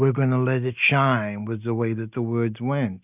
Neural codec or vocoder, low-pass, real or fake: none; 3.6 kHz; real